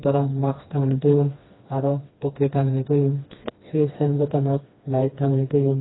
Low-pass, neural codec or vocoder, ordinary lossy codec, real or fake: 7.2 kHz; codec, 16 kHz, 2 kbps, FreqCodec, smaller model; AAC, 16 kbps; fake